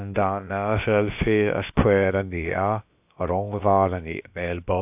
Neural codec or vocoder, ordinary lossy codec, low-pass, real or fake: codec, 16 kHz, about 1 kbps, DyCAST, with the encoder's durations; AAC, 32 kbps; 3.6 kHz; fake